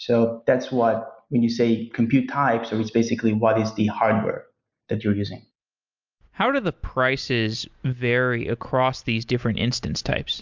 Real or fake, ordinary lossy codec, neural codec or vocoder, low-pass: real; MP3, 64 kbps; none; 7.2 kHz